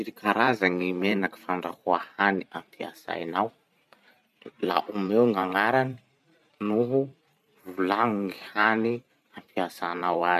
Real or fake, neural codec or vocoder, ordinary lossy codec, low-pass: fake; vocoder, 44.1 kHz, 128 mel bands every 512 samples, BigVGAN v2; none; 14.4 kHz